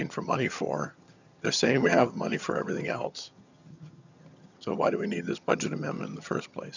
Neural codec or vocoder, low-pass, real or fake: vocoder, 22.05 kHz, 80 mel bands, HiFi-GAN; 7.2 kHz; fake